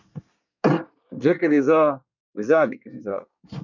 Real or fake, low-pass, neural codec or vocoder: fake; 7.2 kHz; codec, 24 kHz, 1 kbps, SNAC